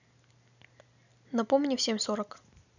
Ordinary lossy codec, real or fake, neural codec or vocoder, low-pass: none; real; none; 7.2 kHz